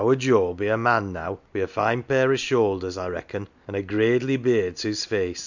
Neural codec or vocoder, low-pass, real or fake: none; 7.2 kHz; real